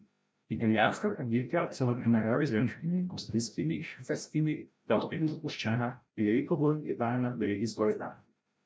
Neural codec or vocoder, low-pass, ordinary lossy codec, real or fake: codec, 16 kHz, 0.5 kbps, FreqCodec, larger model; none; none; fake